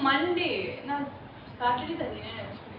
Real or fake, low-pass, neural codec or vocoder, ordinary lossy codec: real; 5.4 kHz; none; none